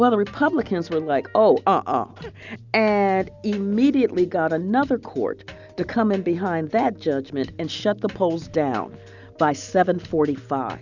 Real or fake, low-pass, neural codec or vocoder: real; 7.2 kHz; none